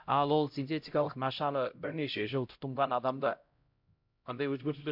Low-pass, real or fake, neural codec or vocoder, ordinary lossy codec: 5.4 kHz; fake; codec, 16 kHz, 0.5 kbps, X-Codec, HuBERT features, trained on LibriSpeech; MP3, 48 kbps